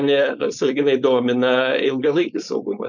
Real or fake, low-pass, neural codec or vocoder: fake; 7.2 kHz; codec, 16 kHz, 4.8 kbps, FACodec